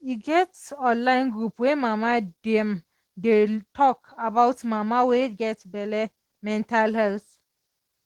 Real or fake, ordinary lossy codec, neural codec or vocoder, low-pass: real; Opus, 16 kbps; none; 19.8 kHz